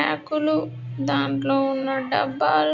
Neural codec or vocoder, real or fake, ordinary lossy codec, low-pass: none; real; none; 7.2 kHz